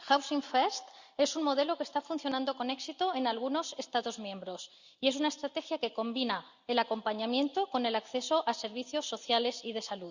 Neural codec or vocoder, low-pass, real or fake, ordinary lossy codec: none; 7.2 kHz; real; Opus, 64 kbps